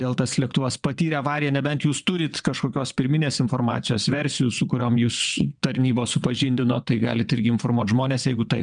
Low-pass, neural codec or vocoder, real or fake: 9.9 kHz; vocoder, 22.05 kHz, 80 mel bands, WaveNeXt; fake